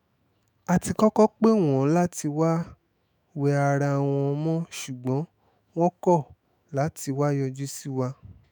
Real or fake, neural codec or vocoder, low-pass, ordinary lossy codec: fake; autoencoder, 48 kHz, 128 numbers a frame, DAC-VAE, trained on Japanese speech; none; none